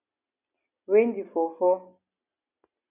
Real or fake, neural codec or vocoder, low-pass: real; none; 3.6 kHz